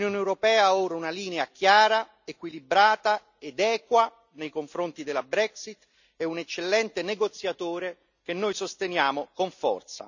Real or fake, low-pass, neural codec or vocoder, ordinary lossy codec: real; 7.2 kHz; none; none